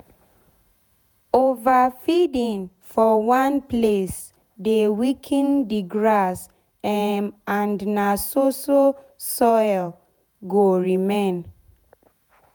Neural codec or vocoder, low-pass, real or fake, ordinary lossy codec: vocoder, 48 kHz, 128 mel bands, Vocos; none; fake; none